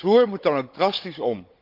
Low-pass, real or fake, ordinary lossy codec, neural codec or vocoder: 5.4 kHz; fake; Opus, 32 kbps; codec, 16 kHz, 16 kbps, FunCodec, trained on Chinese and English, 50 frames a second